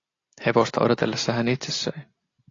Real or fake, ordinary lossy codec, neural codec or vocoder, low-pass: real; AAC, 32 kbps; none; 7.2 kHz